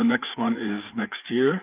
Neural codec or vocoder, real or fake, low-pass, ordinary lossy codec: codec, 16 kHz, 4 kbps, FreqCodec, larger model; fake; 3.6 kHz; Opus, 32 kbps